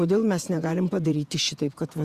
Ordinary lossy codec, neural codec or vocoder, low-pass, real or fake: Opus, 64 kbps; vocoder, 48 kHz, 128 mel bands, Vocos; 14.4 kHz; fake